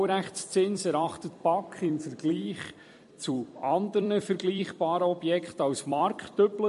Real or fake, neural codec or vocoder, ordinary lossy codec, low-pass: fake; vocoder, 44.1 kHz, 128 mel bands every 256 samples, BigVGAN v2; MP3, 48 kbps; 14.4 kHz